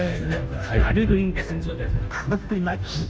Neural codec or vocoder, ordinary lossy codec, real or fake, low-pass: codec, 16 kHz, 0.5 kbps, FunCodec, trained on Chinese and English, 25 frames a second; none; fake; none